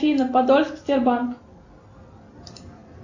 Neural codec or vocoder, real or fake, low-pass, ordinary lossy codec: none; real; 7.2 kHz; AAC, 48 kbps